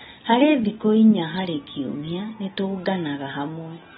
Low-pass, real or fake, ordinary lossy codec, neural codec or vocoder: 19.8 kHz; real; AAC, 16 kbps; none